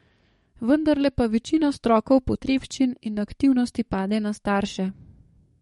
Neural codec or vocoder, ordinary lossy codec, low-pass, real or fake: codec, 44.1 kHz, 7.8 kbps, DAC; MP3, 48 kbps; 19.8 kHz; fake